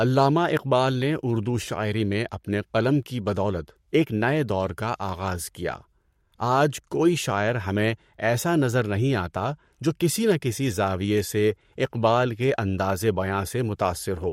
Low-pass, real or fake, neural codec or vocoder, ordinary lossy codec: 19.8 kHz; fake; codec, 44.1 kHz, 7.8 kbps, Pupu-Codec; MP3, 64 kbps